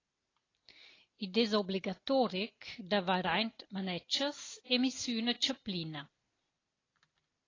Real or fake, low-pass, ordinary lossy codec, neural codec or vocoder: real; 7.2 kHz; AAC, 32 kbps; none